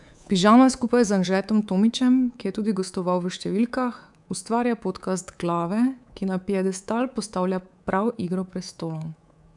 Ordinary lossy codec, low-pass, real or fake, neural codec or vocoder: none; 10.8 kHz; fake; codec, 24 kHz, 3.1 kbps, DualCodec